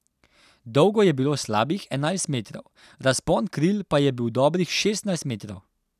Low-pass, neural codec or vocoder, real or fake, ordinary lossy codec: 14.4 kHz; none; real; none